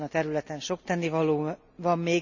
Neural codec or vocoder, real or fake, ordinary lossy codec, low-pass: none; real; none; 7.2 kHz